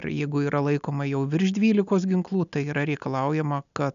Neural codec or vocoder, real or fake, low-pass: none; real; 7.2 kHz